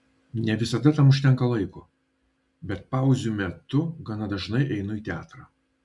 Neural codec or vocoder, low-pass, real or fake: none; 10.8 kHz; real